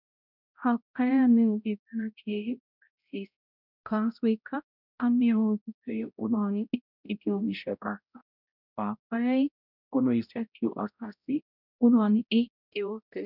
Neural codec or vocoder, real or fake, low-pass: codec, 16 kHz, 0.5 kbps, X-Codec, HuBERT features, trained on balanced general audio; fake; 5.4 kHz